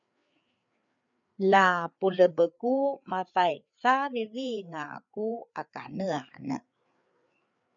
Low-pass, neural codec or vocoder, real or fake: 7.2 kHz; codec, 16 kHz, 4 kbps, FreqCodec, larger model; fake